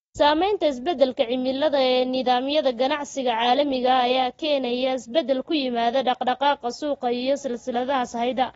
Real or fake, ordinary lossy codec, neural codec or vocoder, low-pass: real; AAC, 24 kbps; none; 19.8 kHz